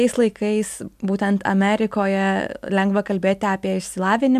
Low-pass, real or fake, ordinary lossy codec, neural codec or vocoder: 14.4 kHz; real; MP3, 96 kbps; none